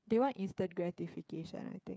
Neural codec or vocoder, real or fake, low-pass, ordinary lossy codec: codec, 16 kHz, 8 kbps, FreqCodec, smaller model; fake; none; none